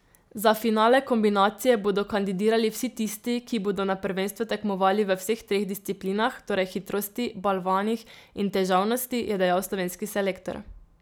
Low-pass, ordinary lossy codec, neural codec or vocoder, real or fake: none; none; none; real